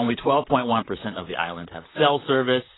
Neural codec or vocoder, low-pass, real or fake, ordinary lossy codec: vocoder, 44.1 kHz, 128 mel bands, Pupu-Vocoder; 7.2 kHz; fake; AAC, 16 kbps